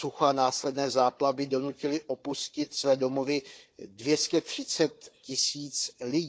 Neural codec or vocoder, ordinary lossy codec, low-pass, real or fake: codec, 16 kHz, 4 kbps, FunCodec, trained on Chinese and English, 50 frames a second; none; none; fake